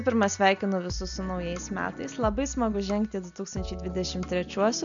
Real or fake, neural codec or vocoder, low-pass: real; none; 7.2 kHz